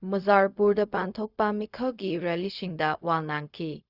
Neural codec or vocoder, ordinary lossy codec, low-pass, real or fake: codec, 16 kHz, 0.4 kbps, LongCat-Audio-Codec; Opus, 64 kbps; 5.4 kHz; fake